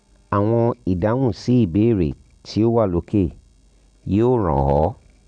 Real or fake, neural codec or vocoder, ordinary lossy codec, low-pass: real; none; none; 9.9 kHz